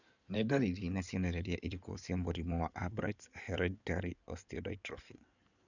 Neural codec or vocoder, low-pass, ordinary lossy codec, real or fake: codec, 16 kHz in and 24 kHz out, 2.2 kbps, FireRedTTS-2 codec; 7.2 kHz; none; fake